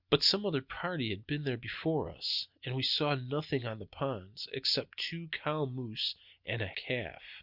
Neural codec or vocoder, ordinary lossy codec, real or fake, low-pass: none; Opus, 64 kbps; real; 5.4 kHz